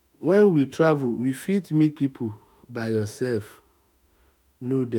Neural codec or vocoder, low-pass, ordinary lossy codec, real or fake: autoencoder, 48 kHz, 32 numbers a frame, DAC-VAE, trained on Japanese speech; 19.8 kHz; none; fake